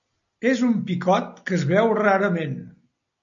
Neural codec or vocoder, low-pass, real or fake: none; 7.2 kHz; real